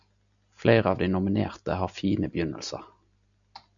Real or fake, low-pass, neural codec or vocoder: real; 7.2 kHz; none